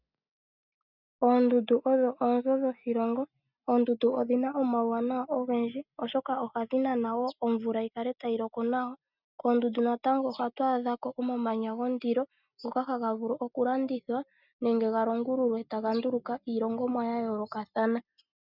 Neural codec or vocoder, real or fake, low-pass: none; real; 5.4 kHz